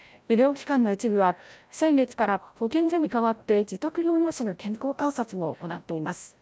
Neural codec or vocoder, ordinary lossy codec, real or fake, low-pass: codec, 16 kHz, 0.5 kbps, FreqCodec, larger model; none; fake; none